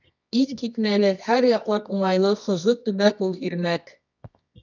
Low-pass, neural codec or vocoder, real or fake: 7.2 kHz; codec, 24 kHz, 0.9 kbps, WavTokenizer, medium music audio release; fake